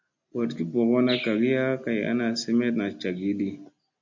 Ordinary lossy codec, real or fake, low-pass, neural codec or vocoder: MP3, 64 kbps; real; 7.2 kHz; none